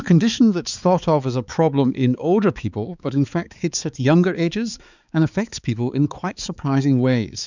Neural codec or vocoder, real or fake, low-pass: codec, 16 kHz, 4 kbps, X-Codec, HuBERT features, trained on balanced general audio; fake; 7.2 kHz